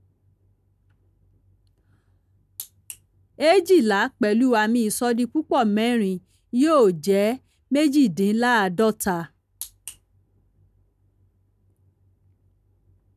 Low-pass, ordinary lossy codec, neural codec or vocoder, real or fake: 14.4 kHz; none; none; real